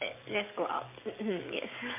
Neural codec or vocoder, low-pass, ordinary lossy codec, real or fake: codec, 44.1 kHz, 7.8 kbps, DAC; 3.6 kHz; MP3, 24 kbps; fake